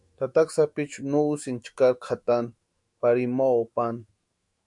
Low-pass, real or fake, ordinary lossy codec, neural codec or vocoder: 10.8 kHz; fake; MP3, 64 kbps; autoencoder, 48 kHz, 128 numbers a frame, DAC-VAE, trained on Japanese speech